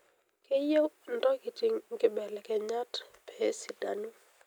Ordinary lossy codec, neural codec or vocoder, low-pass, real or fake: none; none; none; real